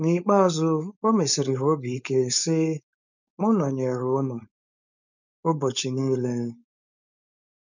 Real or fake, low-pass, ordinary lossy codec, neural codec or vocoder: fake; 7.2 kHz; none; codec, 16 kHz, 4.8 kbps, FACodec